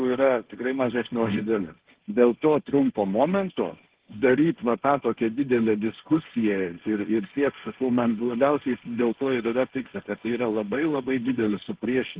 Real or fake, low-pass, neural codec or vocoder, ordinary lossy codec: fake; 3.6 kHz; codec, 16 kHz, 1.1 kbps, Voila-Tokenizer; Opus, 16 kbps